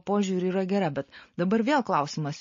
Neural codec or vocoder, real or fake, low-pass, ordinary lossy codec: none; real; 7.2 kHz; MP3, 32 kbps